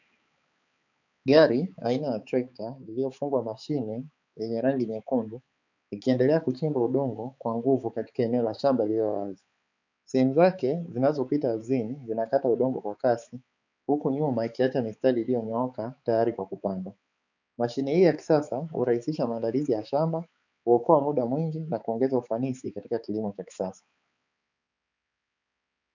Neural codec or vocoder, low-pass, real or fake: codec, 16 kHz, 4 kbps, X-Codec, HuBERT features, trained on balanced general audio; 7.2 kHz; fake